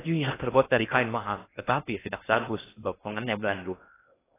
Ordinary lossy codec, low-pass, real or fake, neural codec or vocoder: AAC, 16 kbps; 3.6 kHz; fake; codec, 16 kHz in and 24 kHz out, 0.6 kbps, FocalCodec, streaming, 2048 codes